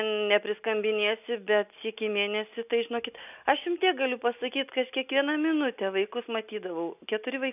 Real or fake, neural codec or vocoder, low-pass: real; none; 3.6 kHz